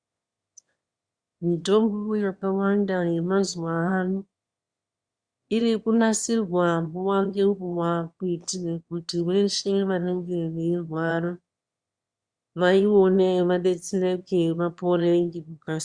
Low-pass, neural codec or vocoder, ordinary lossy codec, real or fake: 9.9 kHz; autoencoder, 22.05 kHz, a latent of 192 numbers a frame, VITS, trained on one speaker; Opus, 64 kbps; fake